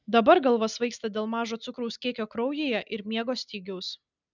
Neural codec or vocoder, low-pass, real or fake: none; 7.2 kHz; real